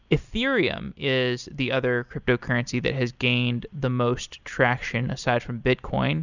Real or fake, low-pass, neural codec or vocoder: real; 7.2 kHz; none